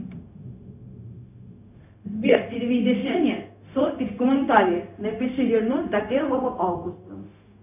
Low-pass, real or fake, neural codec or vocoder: 3.6 kHz; fake; codec, 16 kHz, 0.4 kbps, LongCat-Audio-Codec